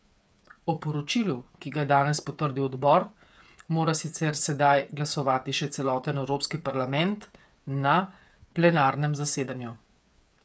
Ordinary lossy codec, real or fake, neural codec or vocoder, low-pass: none; fake; codec, 16 kHz, 8 kbps, FreqCodec, smaller model; none